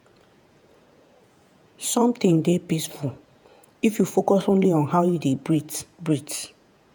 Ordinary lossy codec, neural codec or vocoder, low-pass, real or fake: none; vocoder, 48 kHz, 128 mel bands, Vocos; none; fake